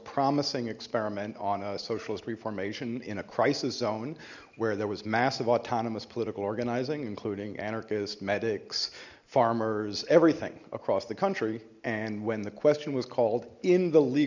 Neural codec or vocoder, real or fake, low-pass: none; real; 7.2 kHz